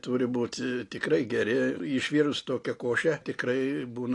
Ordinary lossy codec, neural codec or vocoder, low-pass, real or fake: AAC, 48 kbps; none; 10.8 kHz; real